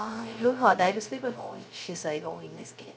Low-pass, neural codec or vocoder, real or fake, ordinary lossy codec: none; codec, 16 kHz, 0.3 kbps, FocalCodec; fake; none